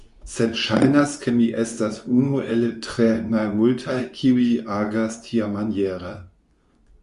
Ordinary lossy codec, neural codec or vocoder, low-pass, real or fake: Opus, 64 kbps; codec, 24 kHz, 0.9 kbps, WavTokenizer, medium speech release version 2; 10.8 kHz; fake